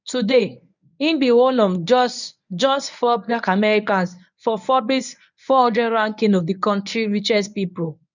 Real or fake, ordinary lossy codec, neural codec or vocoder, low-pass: fake; none; codec, 24 kHz, 0.9 kbps, WavTokenizer, medium speech release version 1; 7.2 kHz